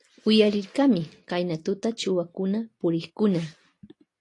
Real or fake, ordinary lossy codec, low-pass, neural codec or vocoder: real; AAC, 48 kbps; 10.8 kHz; none